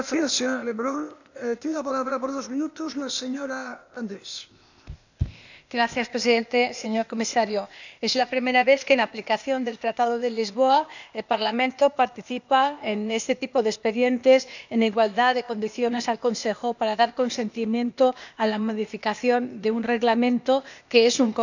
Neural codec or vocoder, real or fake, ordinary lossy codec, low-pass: codec, 16 kHz, 0.8 kbps, ZipCodec; fake; none; 7.2 kHz